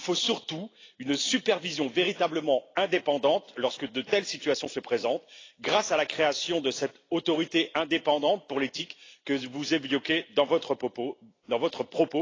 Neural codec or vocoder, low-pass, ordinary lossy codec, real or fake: none; 7.2 kHz; AAC, 32 kbps; real